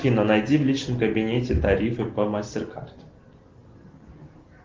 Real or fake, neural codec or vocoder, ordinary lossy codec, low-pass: real; none; Opus, 16 kbps; 7.2 kHz